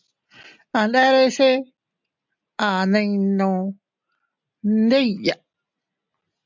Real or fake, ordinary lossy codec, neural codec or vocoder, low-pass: real; MP3, 64 kbps; none; 7.2 kHz